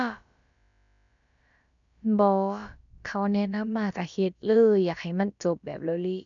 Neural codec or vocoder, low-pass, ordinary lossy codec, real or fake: codec, 16 kHz, about 1 kbps, DyCAST, with the encoder's durations; 7.2 kHz; none; fake